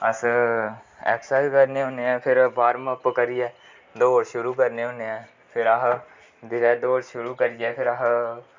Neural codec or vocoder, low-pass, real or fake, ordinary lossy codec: none; 7.2 kHz; real; MP3, 64 kbps